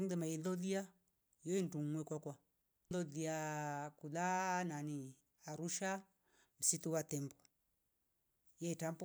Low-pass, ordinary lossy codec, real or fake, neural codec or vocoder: none; none; real; none